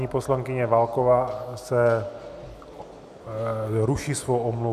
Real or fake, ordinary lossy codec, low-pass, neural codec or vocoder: real; AAC, 96 kbps; 14.4 kHz; none